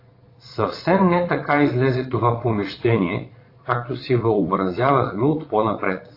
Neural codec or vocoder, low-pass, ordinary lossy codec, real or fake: vocoder, 22.05 kHz, 80 mel bands, Vocos; 5.4 kHz; AAC, 32 kbps; fake